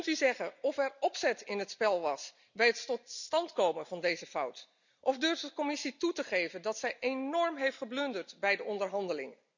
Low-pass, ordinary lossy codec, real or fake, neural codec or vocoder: 7.2 kHz; none; real; none